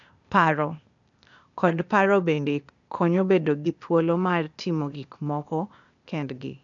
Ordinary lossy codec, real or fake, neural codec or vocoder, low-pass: none; fake; codec, 16 kHz, 0.8 kbps, ZipCodec; 7.2 kHz